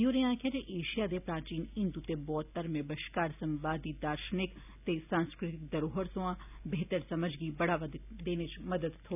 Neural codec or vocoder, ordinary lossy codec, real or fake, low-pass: none; none; real; 3.6 kHz